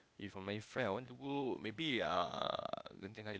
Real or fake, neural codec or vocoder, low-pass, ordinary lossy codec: fake; codec, 16 kHz, 0.8 kbps, ZipCodec; none; none